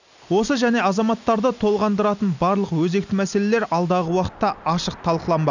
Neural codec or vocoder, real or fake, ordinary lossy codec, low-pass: none; real; none; 7.2 kHz